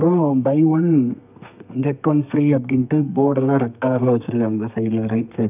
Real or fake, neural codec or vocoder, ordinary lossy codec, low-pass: fake; codec, 32 kHz, 1.9 kbps, SNAC; none; 3.6 kHz